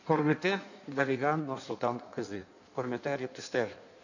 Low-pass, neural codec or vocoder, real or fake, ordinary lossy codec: 7.2 kHz; codec, 16 kHz in and 24 kHz out, 1.1 kbps, FireRedTTS-2 codec; fake; none